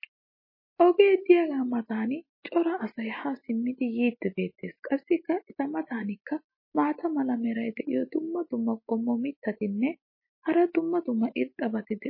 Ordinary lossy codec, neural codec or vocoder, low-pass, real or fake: MP3, 24 kbps; none; 5.4 kHz; real